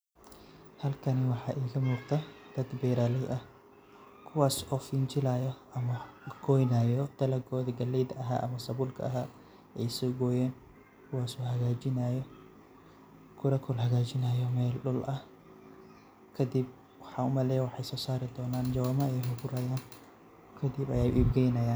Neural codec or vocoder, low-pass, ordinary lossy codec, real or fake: none; none; none; real